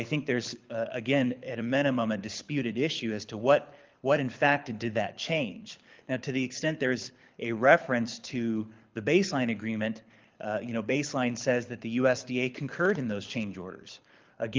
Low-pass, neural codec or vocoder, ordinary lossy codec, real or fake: 7.2 kHz; codec, 24 kHz, 6 kbps, HILCodec; Opus, 24 kbps; fake